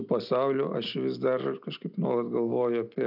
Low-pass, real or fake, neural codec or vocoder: 5.4 kHz; real; none